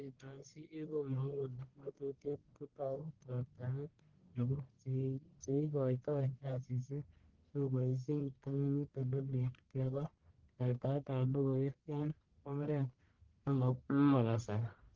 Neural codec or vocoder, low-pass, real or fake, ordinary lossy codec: codec, 44.1 kHz, 1.7 kbps, Pupu-Codec; 7.2 kHz; fake; Opus, 16 kbps